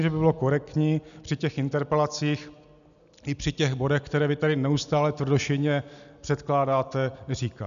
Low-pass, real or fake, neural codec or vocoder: 7.2 kHz; real; none